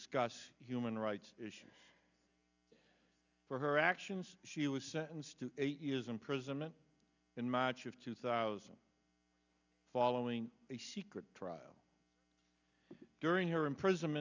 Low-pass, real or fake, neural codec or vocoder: 7.2 kHz; real; none